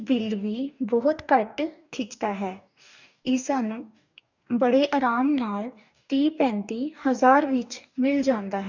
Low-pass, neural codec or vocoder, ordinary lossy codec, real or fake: 7.2 kHz; codec, 44.1 kHz, 2.6 kbps, DAC; none; fake